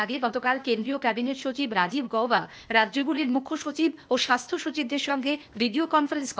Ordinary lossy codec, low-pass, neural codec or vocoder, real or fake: none; none; codec, 16 kHz, 0.8 kbps, ZipCodec; fake